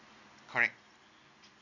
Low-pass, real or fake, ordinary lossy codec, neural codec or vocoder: 7.2 kHz; real; none; none